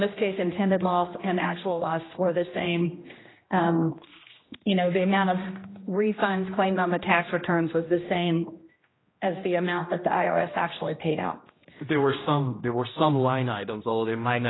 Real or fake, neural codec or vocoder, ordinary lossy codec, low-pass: fake; codec, 16 kHz, 1 kbps, X-Codec, HuBERT features, trained on general audio; AAC, 16 kbps; 7.2 kHz